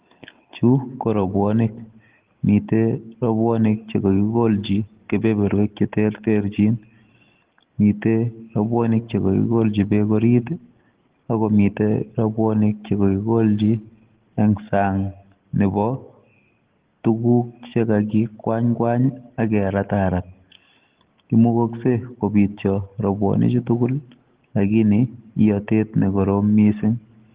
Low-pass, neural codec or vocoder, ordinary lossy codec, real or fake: 3.6 kHz; none; Opus, 16 kbps; real